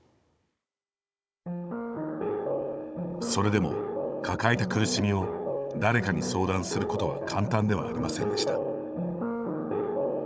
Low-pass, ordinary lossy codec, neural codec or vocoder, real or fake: none; none; codec, 16 kHz, 16 kbps, FunCodec, trained on Chinese and English, 50 frames a second; fake